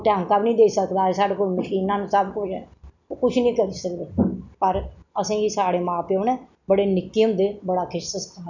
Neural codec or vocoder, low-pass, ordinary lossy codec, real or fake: none; 7.2 kHz; none; real